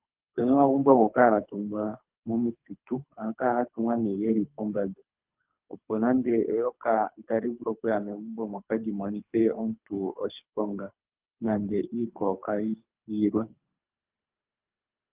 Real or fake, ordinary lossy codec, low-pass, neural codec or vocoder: fake; Opus, 32 kbps; 3.6 kHz; codec, 24 kHz, 3 kbps, HILCodec